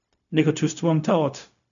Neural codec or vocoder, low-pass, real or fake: codec, 16 kHz, 0.4 kbps, LongCat-Audio-Codec; 7.2 kHz; fake